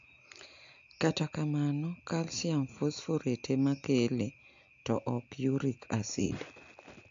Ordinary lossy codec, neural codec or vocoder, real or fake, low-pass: AAC, 64 kbps; none; real; 7.2 kHz